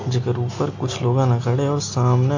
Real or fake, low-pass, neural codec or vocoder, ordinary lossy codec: real; 7.2 kHz; none; AAC, 48 kbps